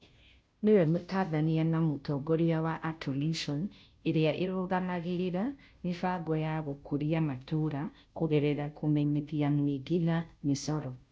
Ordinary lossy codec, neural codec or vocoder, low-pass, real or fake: none; codec, 16 kHz, 0.5 kbps, FunCodec, trained on Chinese and English, 25 frames a second; none; fake